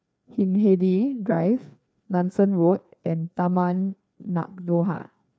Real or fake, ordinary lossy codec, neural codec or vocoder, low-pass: fake; none; codec, 16 kHz, 2 kbps, FreqCodec, larger model; none